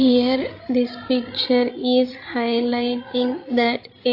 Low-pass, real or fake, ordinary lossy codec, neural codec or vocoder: 5.4 kHz; fake; AAC, 32 kbps; codec, 16 kHz, 16 kbps, FreqCodec, larger model